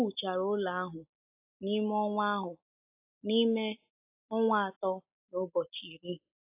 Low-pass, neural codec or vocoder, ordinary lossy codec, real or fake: 3.6 kHz; none; AAC, 32 kbps; real